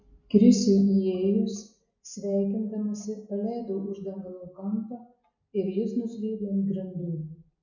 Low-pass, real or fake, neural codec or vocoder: 7.2 kHz; real; none